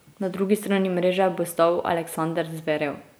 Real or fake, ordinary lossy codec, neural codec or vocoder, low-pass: real; none; none; none